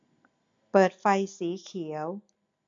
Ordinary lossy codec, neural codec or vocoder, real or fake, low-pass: MP3, 48 kbps; none; real; 7.2 kHz